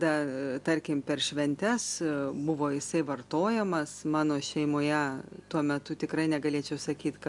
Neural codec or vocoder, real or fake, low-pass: none; real; 10.8 kHz